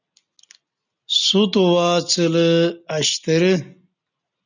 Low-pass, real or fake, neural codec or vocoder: 7.2 kHz; real; none